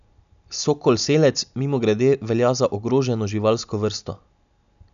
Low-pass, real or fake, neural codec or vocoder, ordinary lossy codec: 7.2 kHz; real; none; none